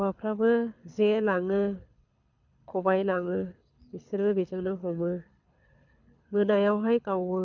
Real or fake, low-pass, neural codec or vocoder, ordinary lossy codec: fake; 7.2 kHz; codec, 24 kHz, 6 kbps, HILCodec; none